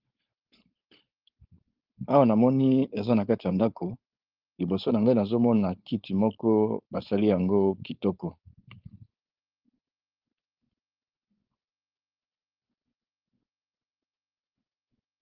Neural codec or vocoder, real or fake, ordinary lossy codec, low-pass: codec, 16 kHz, 4.8 kbps, FACodec; fake; Opus, 32 kbps; 5.4 kHz